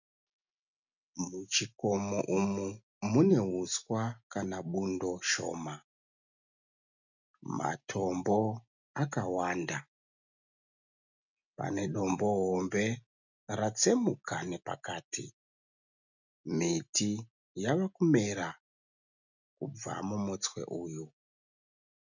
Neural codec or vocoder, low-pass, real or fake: none; 7.2 kHz; real